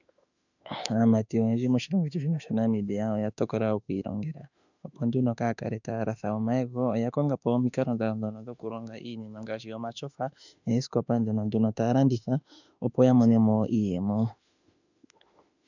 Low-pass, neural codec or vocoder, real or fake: 7.2 kHz; autoencoder, 48 kHz, 32 numbers a frame, DAC-VAE, trained on Japanese speech; fake